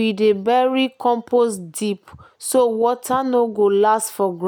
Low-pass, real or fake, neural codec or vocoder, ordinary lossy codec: none; real; none; none